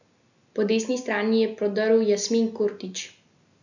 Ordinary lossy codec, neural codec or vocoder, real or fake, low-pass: none; none; real; 7.2 kHz